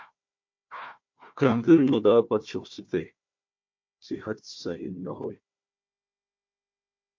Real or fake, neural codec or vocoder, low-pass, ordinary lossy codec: fake; codec, 16 kHz, 1 kbps, FunCodec, trained on Chinese and English, 50 frames a second; 7.2 kHz; MP3, 48 kbps